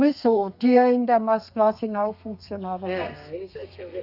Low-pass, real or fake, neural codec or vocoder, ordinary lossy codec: 5.4 kHz; fake; codec, 32 kHz, 1.9 kbps, SNAC; none